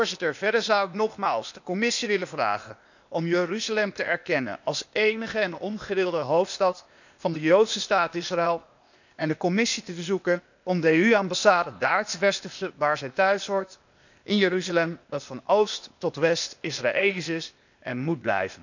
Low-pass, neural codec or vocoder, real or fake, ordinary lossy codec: 7.2 kHz; codec, 16 kHz, 0.8 kbps, ZipCodec; fake; none